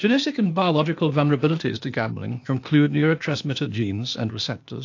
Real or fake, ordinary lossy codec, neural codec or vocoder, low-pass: fake; AAC, 48 kbps; codec, 16 kHz, 0.8 kbps, ZipCodec; 7.2 kHz